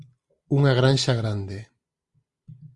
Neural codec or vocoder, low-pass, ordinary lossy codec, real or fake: none; 10.8 kHz; Opus, 64 kbps; real